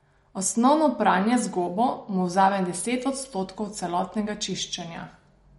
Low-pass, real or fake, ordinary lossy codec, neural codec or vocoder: 19.8 kHz; real; MP3, 48 kbps; none